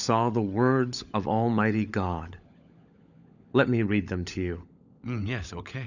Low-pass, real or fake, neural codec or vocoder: 7.2 kHz; fake; codec, 16 kHz, 16 kbps, FunCodec, trained on LibriTTS, 50 frames a second